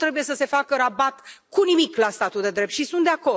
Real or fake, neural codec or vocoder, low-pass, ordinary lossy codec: real; none; none; none